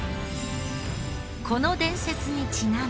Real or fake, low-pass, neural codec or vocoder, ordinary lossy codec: real; none; none; none